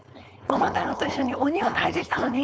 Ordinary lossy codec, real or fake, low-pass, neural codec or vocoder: none; fake; none; codec, 16 kHz, 4.8 kbps, FACodec